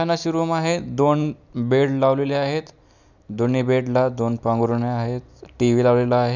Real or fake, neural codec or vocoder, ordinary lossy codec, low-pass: real; none; none; 7.2 kHz